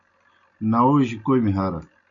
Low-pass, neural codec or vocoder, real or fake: 7.2 kHz; none; real